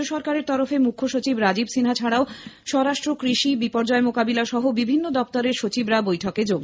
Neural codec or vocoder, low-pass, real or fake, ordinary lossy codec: none; none; real; none